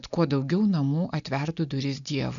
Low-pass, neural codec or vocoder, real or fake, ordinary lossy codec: 7.2 kHz; none; real; MP3, 96 kbps